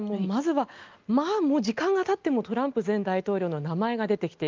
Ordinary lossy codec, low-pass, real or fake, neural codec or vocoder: Opus, 24 kbps; 7.2 kHz; real; none